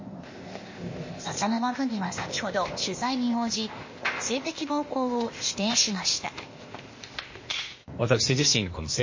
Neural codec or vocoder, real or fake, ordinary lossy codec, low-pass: codec, 16 kHz, 0.8 kbps, ZipCodec; fake; MP3, 32 kbps; 7.2 kHz